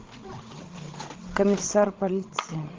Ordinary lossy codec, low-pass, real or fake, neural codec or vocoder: Opus, 16 kbps; 7.2 kHz; fake; codec, 16 kHz, 16 kbps, FreqCodec, larger model